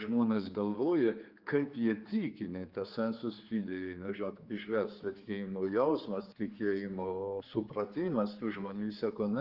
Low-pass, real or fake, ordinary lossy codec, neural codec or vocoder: 5.4 kHz; fake; Opus, 24 kbps; codec, 16 kHz, 4 kbps, X-Codec, HuBERT features, trained on general audio